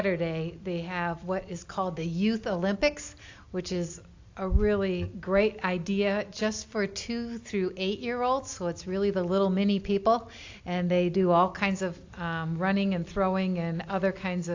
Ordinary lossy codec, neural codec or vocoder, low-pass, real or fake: AAC, 48 kbps; none; 7.2 kHz; real